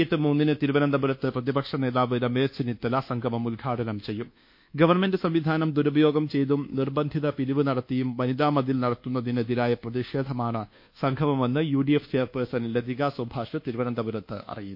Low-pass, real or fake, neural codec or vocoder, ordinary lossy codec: 5.4 kHz; fake; codec, 24 kHz, 1.2 kbps, DualCodec; MP3, 32 kbps